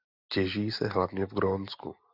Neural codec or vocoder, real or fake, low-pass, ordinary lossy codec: none; real; 5.4 kHz; Opus, 64 kbps